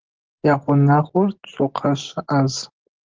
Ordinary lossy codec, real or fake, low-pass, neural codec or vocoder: Opus, 16 kbps; real; 7.2 kHz; none